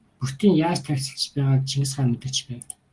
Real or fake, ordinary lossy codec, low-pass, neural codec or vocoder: real; Opus, 24 kbps; 10.8 kHz; none